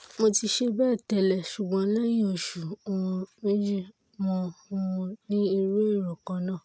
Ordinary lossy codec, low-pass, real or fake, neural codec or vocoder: none; none; real; none